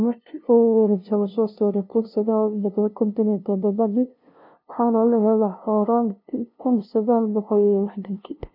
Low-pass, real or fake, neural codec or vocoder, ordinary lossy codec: 5.4 kHz; fake; codec, 16 kHz, 0.5 kbps, FunCodec, trained on LibriTTS, 25 frames a second; none